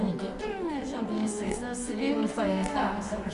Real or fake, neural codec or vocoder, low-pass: fake; codec, 24 kHz, 0.9 kbps, WavTokenizer, medium music audio release; 10.8 kHz